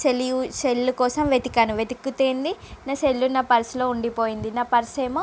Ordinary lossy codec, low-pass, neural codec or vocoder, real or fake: none; none; none; real